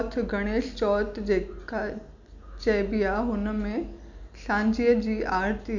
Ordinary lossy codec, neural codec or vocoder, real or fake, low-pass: none; none; real; 7.2 kHz